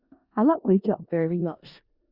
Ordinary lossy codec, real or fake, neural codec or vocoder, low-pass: Opus, 64 kbps; fake; codec, 16 kHz in and 24 kHz out, 0.4 kbps, LongCat-Audio-Codec, four codebook decoder; 5.4 kHz